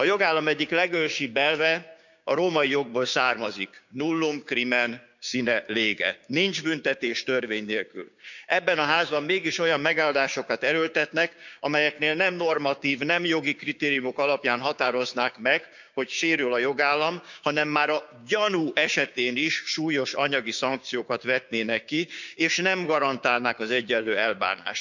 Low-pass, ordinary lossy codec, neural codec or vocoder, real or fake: 7.2 kHz; none; codec, 16 kHz, 6 kbps, DAC; fake